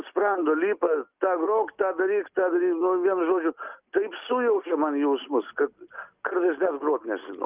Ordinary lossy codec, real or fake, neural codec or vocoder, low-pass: Opus, 24 kbps; real; none; 3.6 kHz